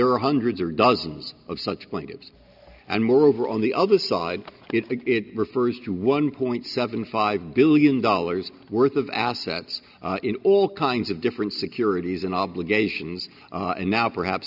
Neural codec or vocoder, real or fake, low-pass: none; real; 5.4 kHz